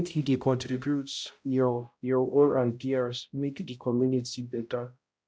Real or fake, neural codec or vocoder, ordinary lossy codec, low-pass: fake; codec, 16 kHz, 0.5 kbps, X-Codec, HuBERT features, trained on balanced general audio; none; none